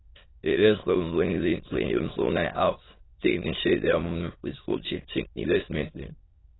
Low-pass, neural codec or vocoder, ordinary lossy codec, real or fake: 7.2 kHz; autoencoder, 22.05 kHz, a latent of 192 numbers a frame, VITS, trained on many speakers; AAC, 16 kbps; fake